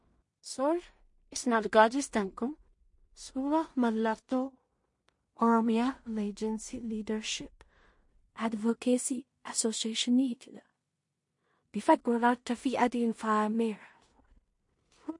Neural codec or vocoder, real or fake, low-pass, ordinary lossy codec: codec, 16 kHz in and 24 kHz out, 0.4 kbps, LongCat-Audio-Codec, two codebook decoder; fake; 10.8 kHz; MP3, 48 kbps